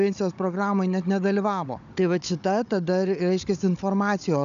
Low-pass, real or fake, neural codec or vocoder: 7.2 kHz; fake; codec, 16 kHz, 16 kbps, FunCodec, trained on Chinese and English, 50 frames a second